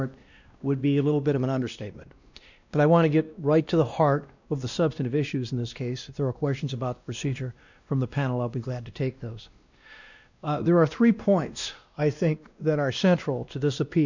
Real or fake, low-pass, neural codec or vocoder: fake; 7.2 kHz; codec, 16 kHz, 1 kbps, X-Codec, WavLM features, trained on Multilingual LibriSpeech